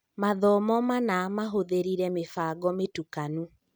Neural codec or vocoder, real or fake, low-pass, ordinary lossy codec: none; real; none; none